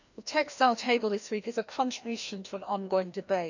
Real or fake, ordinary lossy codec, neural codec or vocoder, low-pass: fake; none; codec, 16 kHz, 1 kbps, FreqCodec, larger model; 7.2 kHz